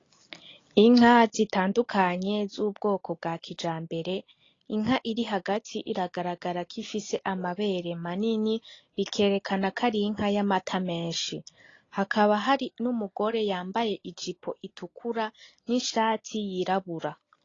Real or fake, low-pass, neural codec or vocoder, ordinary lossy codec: real; 7.2 kHz; none; AAC, 32 kbps